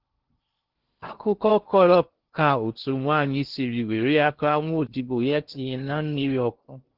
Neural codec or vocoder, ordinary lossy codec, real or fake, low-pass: codec, 16 kHz in and 24 kHz out, 0.6 kbps, FocalCodec, streaming, 2048 codes; Opus, 16 kbps; fake; 5.4 kHz